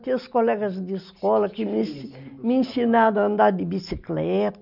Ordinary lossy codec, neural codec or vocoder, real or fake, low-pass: none; none; real; 5.4 kHz